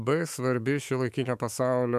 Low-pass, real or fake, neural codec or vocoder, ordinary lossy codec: 14.4 kHz; fake; autoencoder, 48 kHz, 128 numbers a frame, DAC-VAE, trained on Japanese speech; MP3, 96 kbps